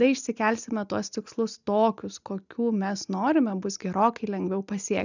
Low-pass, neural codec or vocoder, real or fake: 7.2 kHz; none; real